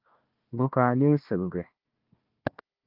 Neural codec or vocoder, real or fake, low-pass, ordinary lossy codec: codec, 16 kHz, 1 kbps, FunCodec, trained on Chinese and English, 50 frames a second; fake; 5.4 kHz; Opus, 24 kbps